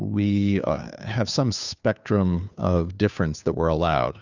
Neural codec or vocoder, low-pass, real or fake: codec, 16 kHz, 2 kbps, FunCodec, trained on Chinese and English, 25 frames a second; 7.2 kHz; fake